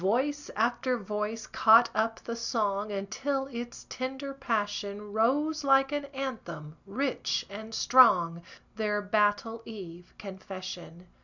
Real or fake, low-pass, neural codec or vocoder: real; 7.2 kHz; none